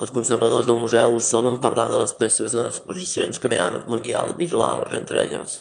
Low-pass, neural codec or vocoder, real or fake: 9.9 kHz; autoencoder, 22.05 kHz, a latent of 192 numbers a frame, VITS, trained on one speaker; fake